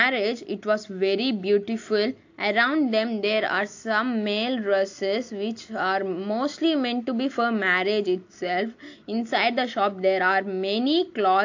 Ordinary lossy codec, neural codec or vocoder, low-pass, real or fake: AAC, 48 kbps; none; 7.2 kHz; real